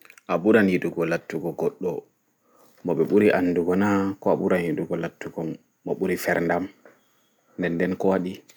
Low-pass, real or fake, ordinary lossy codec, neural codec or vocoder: none; real; none; none